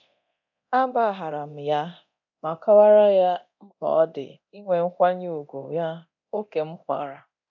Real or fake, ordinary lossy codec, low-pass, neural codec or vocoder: fake; none; 7.2 kHz; codec, 24 kHz, 0.9 kbps, DualCodec